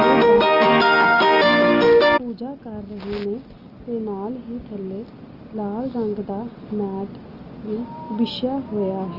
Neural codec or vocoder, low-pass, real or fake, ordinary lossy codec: none; 5.4 kHz; real; Opus, 24 kbps